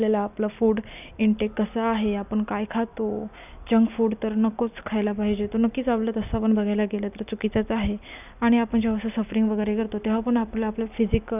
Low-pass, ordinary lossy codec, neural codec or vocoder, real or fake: 3.6 kHz; none; none; real